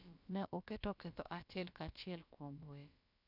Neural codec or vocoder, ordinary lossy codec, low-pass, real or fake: codec, 16 kHz, about 1 kbps, DyCAST, with the encoder's durations; none; 5.4 kHz; fake